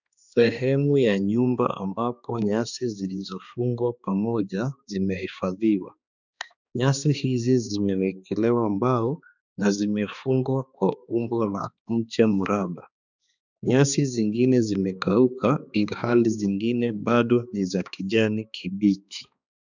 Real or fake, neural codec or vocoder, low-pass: fake; codec, 16 kHz, 2 kbps, X-Codec, HuBERT features, trained on balanced general audio; 7.2 kHz